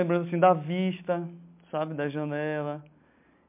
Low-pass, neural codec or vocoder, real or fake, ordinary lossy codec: 3.6 kHz; none; real; MP3, 32 kbps